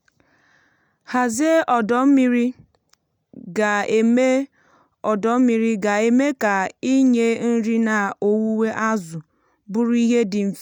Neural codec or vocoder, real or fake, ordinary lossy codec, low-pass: none; real; none; 19.8 kHz